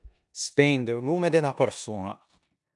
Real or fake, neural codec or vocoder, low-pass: fake; codec, 16 kHz in and 24 kHz out, 0.9 kbps, LongCat-Audio-Codec, four codebook decoder; 10.8 kHz